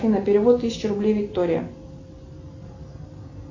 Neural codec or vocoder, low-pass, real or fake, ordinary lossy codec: none; 7.2 kHz; real; AAC, 32 kbps